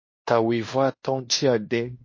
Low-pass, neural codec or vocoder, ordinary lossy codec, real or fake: 7.2 kHz; codec, 16 kHz in and 24 kHz out, 0.9 kbps, LongCat-Audio-Codec, fine tuned four codebook decoder; MP3, 32 kbps; fake